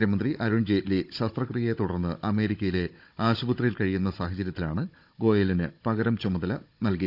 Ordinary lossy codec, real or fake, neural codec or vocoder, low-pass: none; fake; codec, 16 kHz, 16 kbps, FunCodec, trained on Chinese and English, 50 frames a second; 5.4 kHz